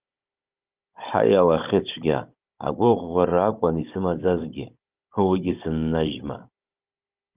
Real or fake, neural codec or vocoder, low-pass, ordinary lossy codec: fake; codec, 16 kHz, 16 kbps, FunCodec, trained on Chinese and English, 50 frames a second; 3.6 kHz; Opus, 24 kbps